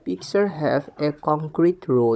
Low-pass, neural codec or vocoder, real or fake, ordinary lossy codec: none; codec, 16 kHz, 16 kbps, FunCodec, trained on Chinese and English, 50 frames a second; fake; none